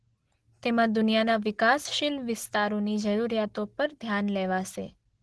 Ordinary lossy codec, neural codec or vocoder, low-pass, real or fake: Opus, 16 kbps; none; 10.8 kHz; real